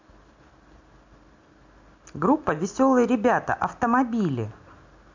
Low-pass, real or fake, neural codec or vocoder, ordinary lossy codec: 7.2 kHz; real; none; MP3, 64 kbps